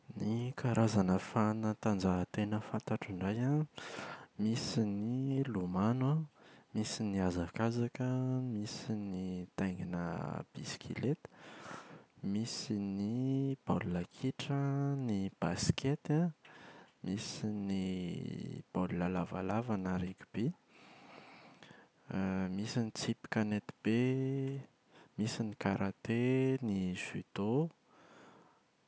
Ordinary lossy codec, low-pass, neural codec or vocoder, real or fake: none; none; none; real